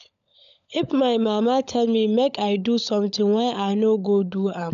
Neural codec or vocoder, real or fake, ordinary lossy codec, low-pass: codec, 16 kHz, 16 kbps, FunCodec, trained on LibriTTS, 50 frames a second; fake; none; 7.2 kHz